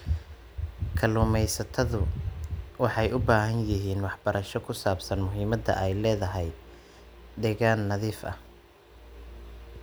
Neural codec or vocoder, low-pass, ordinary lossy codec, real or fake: none; none; none; real